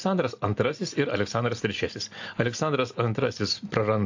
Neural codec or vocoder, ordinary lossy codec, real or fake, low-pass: none; AAC, 48 kbps; real; 7.2 kHz